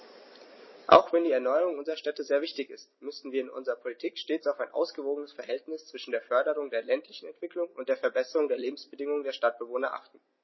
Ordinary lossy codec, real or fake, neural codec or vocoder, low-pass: MP3, 24 kbps; real; none; 7.2 kHz